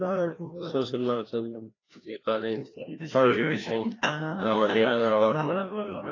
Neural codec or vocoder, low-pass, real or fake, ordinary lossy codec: codec, 16 kHz, 1 kbps, FreqCodec, larger model; 7.2 kHz; fake; AAC, 32 kbps